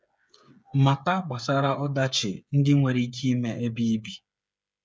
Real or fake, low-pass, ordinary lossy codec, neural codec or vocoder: fake; none; none; codec, 16 kHz, 8 kbps, FreqCodec, smaller model